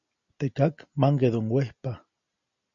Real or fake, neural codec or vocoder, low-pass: real; none; 7.2 kHz